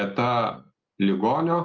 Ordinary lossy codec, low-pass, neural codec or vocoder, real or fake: Opus, 24 kbps; 7.2 kHz; none; real